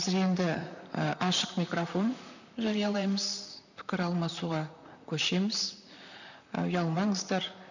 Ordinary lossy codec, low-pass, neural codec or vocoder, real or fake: none; 7.2 kHz; vocoder, 44.1 kHz, 128 mel bands, Pupu-Vocoder; fake